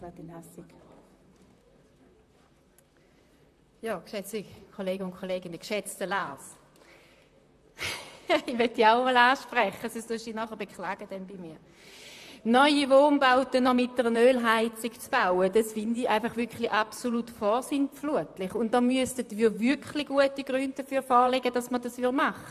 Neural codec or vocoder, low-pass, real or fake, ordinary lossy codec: vocoder, 44.1 kHz, 128 mel bands, Pupu-Vocoder; 14.4 kHz; fake; AAC, 96 kbps